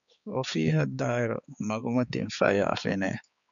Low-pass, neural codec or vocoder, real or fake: 7.2 kHz; codec, 16 kHz, 4 kbps, X-Codec, HuBERT features, trained on balanced general audio; fake